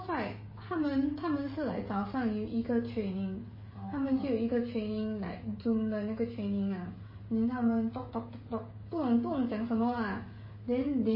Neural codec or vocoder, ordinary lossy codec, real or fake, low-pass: codec, 16 kHz, 16 kbps, FreqCodec, smaller model; MP3, 24 kbps; fake; 5.4 kHz